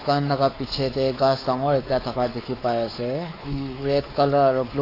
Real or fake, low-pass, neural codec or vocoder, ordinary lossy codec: fake; 5.4 kHz; codec, 16 kHz, 4 kbps, X-Codec, WavLM features, trained on Multilingual LibriSpeech; AAC, 24 kbps